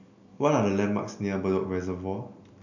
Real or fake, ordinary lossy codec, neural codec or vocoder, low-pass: real; none; none; 7.2 kHz